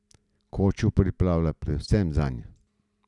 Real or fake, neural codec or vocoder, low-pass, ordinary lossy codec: real; none; 10.8 kHz; none